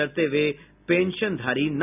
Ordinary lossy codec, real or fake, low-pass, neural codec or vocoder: none; real; 3.6 kHz; none